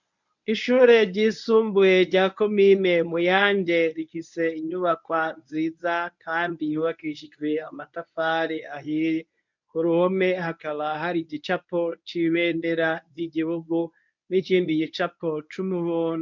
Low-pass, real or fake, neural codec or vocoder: 7.2 kHz; fake; codec, 24 kHz, 0.9 kbps, WavTokenizer, medium speech release version 1